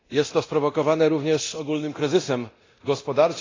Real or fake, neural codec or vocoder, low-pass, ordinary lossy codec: fake; codec, 24 kHz, 0.9 kbps, DualCodec; 7.2 kHz; AAC, 32 kbps